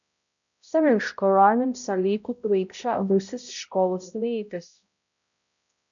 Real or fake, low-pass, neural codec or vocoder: fake; 7.2 kHz; codec, 16 kHz, 0.5 kbps, X-Codec, HuBERT features, trained on balanced general audio